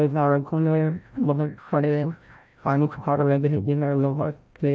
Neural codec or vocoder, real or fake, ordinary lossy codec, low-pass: codec, 16 kHz, 0.5 kbps, FreqCodec, larger model; fake; none; none